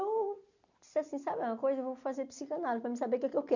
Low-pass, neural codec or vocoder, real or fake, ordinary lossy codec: 7.2 kHz; none; real; none